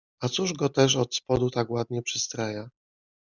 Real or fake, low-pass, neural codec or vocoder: real; 7.2 kHz; none